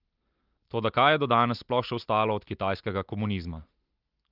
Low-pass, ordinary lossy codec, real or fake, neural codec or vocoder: 5.4 kHz; Opus, 32 kbps; real; none